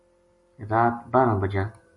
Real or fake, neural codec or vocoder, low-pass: real; none; 10.8 kHz